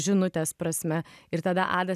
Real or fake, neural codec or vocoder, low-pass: real; none; 14.4 kHz